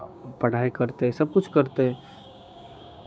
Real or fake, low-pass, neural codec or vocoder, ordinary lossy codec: fake; none; codec, 16 kHz, 6 kbps, DAC; none